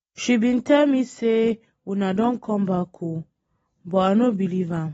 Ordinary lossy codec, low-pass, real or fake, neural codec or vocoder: AAC, 24 kbps; 19.8 kHz; real; none